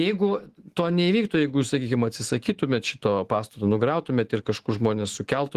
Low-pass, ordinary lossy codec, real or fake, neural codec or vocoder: 14.4 kHz; Opus, 16 kbps; fake; autoencoder, 48 kHz, 128 numbers a frame, DAC-VAE, trained on Japanese speech